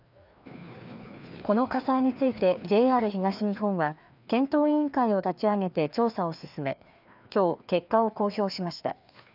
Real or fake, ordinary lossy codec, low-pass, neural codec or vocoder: fake; none; 5.4 kHz; codec, 16 kHz, 2 kbps, FreqCodec, larger model